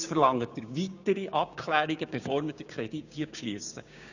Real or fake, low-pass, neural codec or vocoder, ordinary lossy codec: fake; 7.2 kHz; codec, 16 kHz, 6 kbps, DAC; none